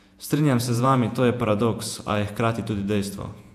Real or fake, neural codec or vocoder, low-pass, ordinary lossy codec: fake; vocoder, 48 kHz, 128 mel bands, Vocos; 14.4 kHz; AAC, 96 kbps